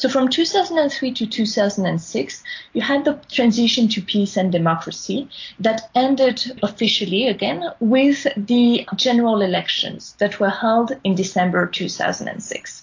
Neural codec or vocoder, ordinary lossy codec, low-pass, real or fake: none; AAC, 48 kbps; 7.2 kHz; real